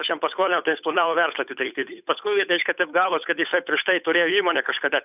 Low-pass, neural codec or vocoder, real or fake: 3.6 kHz; codec, 16 kHz, 8 kbps, FunCodec, trained on Chinese and English, 25 frames a second; fake